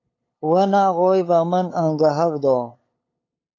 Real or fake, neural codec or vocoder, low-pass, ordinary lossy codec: fake; codec, 16 kHz, 8 kbps, FunCodec, trained on LibriTTS, 25 frames a second; 7.2 kHz; AAC, 32 kbps